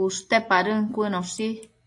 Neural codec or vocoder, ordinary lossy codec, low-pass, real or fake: none; AAC, 48 kbps; 10.8 kHz; real